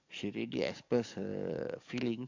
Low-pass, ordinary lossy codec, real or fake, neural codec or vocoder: 7.2 kHz; MP3, 64 kbps; fake; codec, 44.1 kHz, 7.8 kbps, DAC